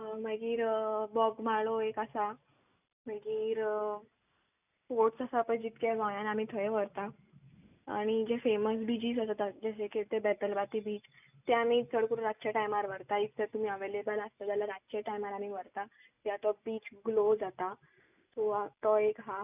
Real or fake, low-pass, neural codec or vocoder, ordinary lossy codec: real; 3.6 kHz; none; none